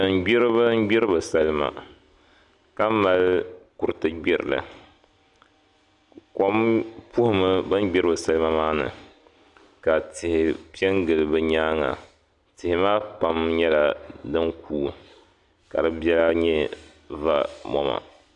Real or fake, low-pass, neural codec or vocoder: real; 10.8 kHz; none